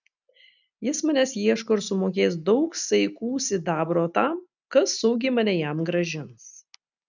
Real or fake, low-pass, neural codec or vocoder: real; 7.2 kHz; none